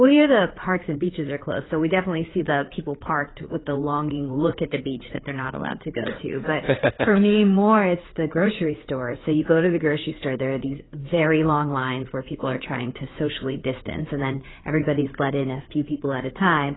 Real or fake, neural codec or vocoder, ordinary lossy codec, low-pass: fake; codec, 16 kHz, 4 kbps, FreqCodec, larger model; AAC, 16 kbps; 7.2 kHz